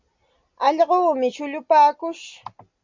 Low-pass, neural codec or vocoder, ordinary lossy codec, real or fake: 7.2 kHz; none; MP3, 48 kbps; real